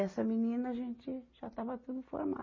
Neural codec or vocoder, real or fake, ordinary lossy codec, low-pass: none; real; MP3, 32 kbps; 7.2 kHz